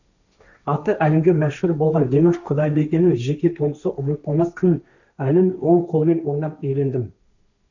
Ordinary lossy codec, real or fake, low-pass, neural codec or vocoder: none; fake; 7.2 kHz; codec, 16 kHz, 1.1 kbps, Voila-Tokenizer